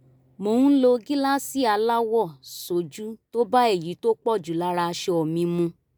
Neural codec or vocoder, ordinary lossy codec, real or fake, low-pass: none; none; real; none